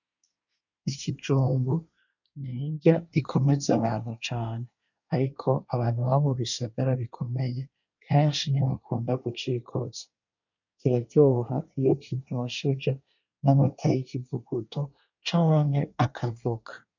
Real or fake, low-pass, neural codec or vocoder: fake; 7.2 kHz; codec, 24 kHz, 1 kbps, SNAC